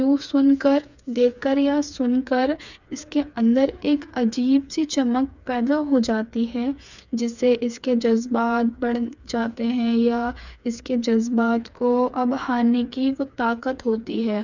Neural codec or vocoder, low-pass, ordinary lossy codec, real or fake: codec, 16 kHz, 4 kbps, FreqCodec, smaller model; 7.2 kHz; none; fake